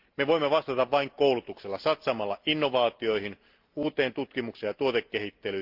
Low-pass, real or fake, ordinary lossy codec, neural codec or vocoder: 5.4 kHz; real; Opus, 32 kbps; none